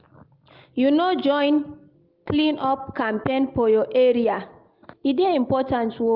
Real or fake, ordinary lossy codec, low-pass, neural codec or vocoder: real; Opus, 24 kbps; 5.4 kHz; none